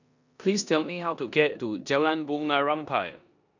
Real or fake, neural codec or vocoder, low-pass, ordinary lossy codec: fake; codec, 16 kHz in and 24 kHz out, 0.9 kbps, LongCat-Audio-Codec, four codebook decoder; 7.2 kHz; none